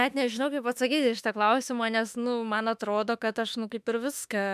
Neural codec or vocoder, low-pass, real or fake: autoencoder, 48 kHz, 32 numbers a frame, DAC-VAE, trained on Japanese speech; 14.4 kHz; fake